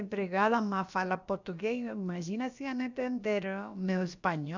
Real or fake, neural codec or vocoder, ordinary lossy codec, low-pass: fake; codec, 16 kHz, about 1 kbps, DyCAST, with the encoder's durations; none; 7.2 kHz